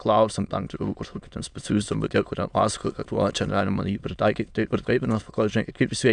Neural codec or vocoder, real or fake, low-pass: autoencoder, 22.05 kHz, a latent of 192 numbers a frame, VITS, trained on many speakers; fake; 9.9 kHz